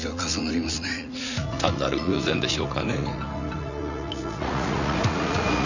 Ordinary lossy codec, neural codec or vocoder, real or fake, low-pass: none; vocoder, 44.1 kHz, 80 mel bands, Vocos; fake; 7.2 kHz